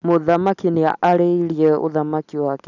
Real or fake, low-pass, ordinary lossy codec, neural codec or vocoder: real; 7.2 kHz; none; none